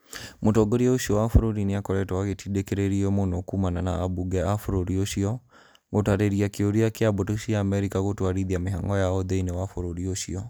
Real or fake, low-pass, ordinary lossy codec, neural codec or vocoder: real; none; none; none